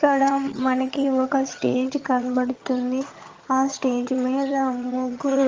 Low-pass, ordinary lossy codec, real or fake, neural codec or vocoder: 7.2 kHz; Opus, 24 kbps; fake; vocoder, 22.05 kHz, 80 mel bands, HiFi-GAN